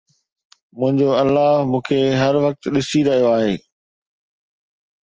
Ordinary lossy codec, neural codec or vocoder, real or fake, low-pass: Opus, 24 kbps; none; real; 7.2 kHz